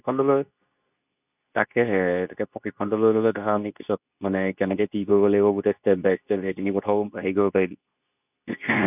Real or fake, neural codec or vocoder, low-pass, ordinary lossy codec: fake; codec, 24 kHz, 0.9 kbps, WavTokenizer, medium speech release version 2; 3.6 kHz; none